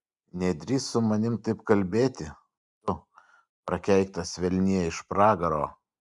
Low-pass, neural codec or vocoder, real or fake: 10.8 kHz; none; real